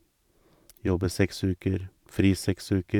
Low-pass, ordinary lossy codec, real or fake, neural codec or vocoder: 19.8 kHz; none; fake; vocoder, 44.1 kHz, 128 mel bands every 512 samples, BigVGAN v2